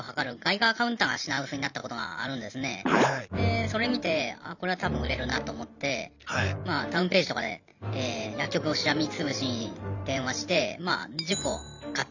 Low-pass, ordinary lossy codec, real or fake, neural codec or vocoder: 7.2 kHz; none; fake; vocoder, 44.1 kHz, 128 mel bands every 512 samples, BigVGAN v2